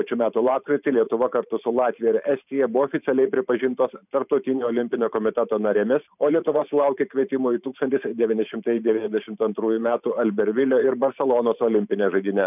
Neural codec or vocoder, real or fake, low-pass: vocoder, 44.1 kHz, 128 mel bands every 512 samples, BigVGAN v2; fake; 3.6 kHz